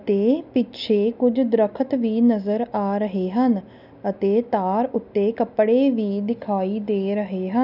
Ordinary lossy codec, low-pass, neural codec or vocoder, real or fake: none; 5.4 kHz; none; real